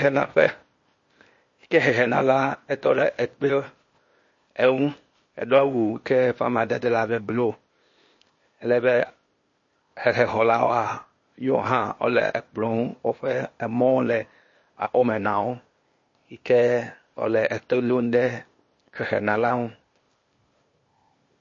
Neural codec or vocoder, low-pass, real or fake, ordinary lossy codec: codec, 16 kHz, 0.8 kbps, ZipCodec; 7.2 kHz; fake; MP3, 32 kbps